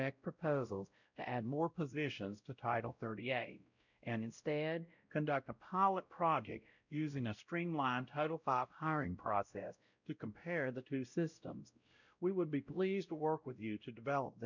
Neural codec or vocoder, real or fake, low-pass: codec, 16 kHz, 0.5 kbps, X-Codec, WavLM features, trained on Multilingual LibriSpeech; fake; 7.2 kHz